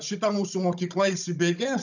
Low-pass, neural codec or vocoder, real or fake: 7.2 kHz; codec, 16 kHz, 16 kbps, FunCodec, trained on Chinese and English, 50 frames a second; fake